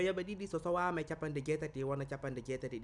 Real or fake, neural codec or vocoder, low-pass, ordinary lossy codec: real; none; 10.8 kHz; none